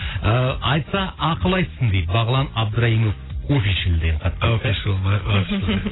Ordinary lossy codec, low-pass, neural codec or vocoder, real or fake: AAC, 16 kbps; 7.2 kHz; none; real